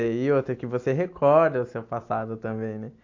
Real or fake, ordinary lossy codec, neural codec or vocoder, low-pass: real; AAC, 48 kbps; none; 7.2 kHz